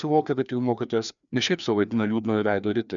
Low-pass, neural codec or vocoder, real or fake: 7.2 kHz; codec, 16 kHz, 2 kbps, FreqCodec, larger model; fake